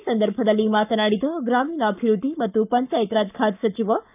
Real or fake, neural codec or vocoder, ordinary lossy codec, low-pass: fake; codec, 44.1 kHz, 7.8 kbps, Pupu-Codec; none; 3.6 kHz